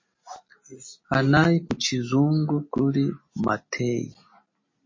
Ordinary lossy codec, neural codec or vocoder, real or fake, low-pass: MP3, 32 kbps; none; real; 7.2 kHz